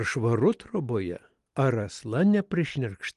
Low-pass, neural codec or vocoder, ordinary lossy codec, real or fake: 10.8 kHz; none; Opus, 24 kbps; real